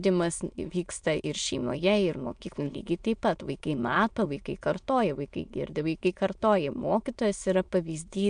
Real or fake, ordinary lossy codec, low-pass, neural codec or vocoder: fake; MP3, 64 kbps; 9.9 kHz; autoencoder, 22.05 kHz, a latent of 192 numbers a frame, VITS, trained on many speakers